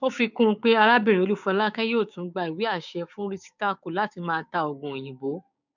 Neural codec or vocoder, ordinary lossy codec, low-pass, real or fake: codec, 16 kHz, 6 kbps, DAC; none; 7.2 kHz; fake